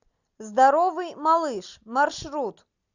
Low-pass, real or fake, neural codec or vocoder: 7.2 kHz; real; none